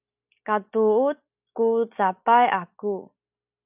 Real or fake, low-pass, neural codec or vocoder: real; 3.6 kHz; none